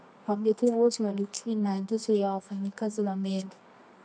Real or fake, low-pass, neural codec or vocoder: fake; 9.9 kHz; codec, 24 kHz, 0.9 kbps, WavTokenizer, medium music audio release